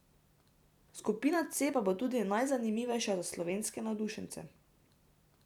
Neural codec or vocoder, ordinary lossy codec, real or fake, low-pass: vocoder, 44.1 kHz, 128 mel bands every 256 samples, BigVGAN v2; none; fake; 19.8 kHz